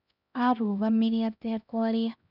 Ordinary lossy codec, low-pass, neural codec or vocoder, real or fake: none; 5.4 kHz; codec, 16 kHz, 1 kbps, X-Codec, HuBERT features, trained on LibriSpeech; fake